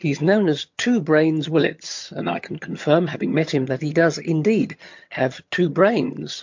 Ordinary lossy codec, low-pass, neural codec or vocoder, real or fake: MP3, 48 kbps; 7.2 kHz; vocoder, 22.05 kHz, 80 mel bands, HiFi-GAN; fake